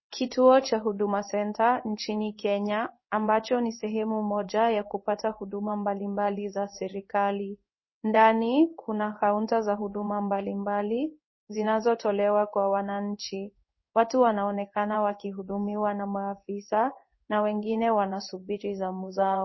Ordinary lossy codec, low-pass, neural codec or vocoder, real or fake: MP3, 24 kbps; 7.2 kHz; codec, 16 kHz in and 24 kHz out, 1 kbps, XY-Tokenizer; fake